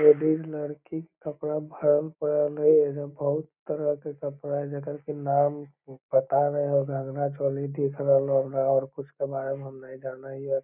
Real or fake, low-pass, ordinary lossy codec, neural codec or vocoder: real; 3.6 kHz; none; none